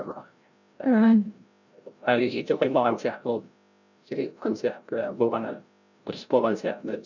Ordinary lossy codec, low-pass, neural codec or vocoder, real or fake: none; 7.2 kHz; codec, 16 kHz, 0.5 kbps, FreqCodec, larger model; fake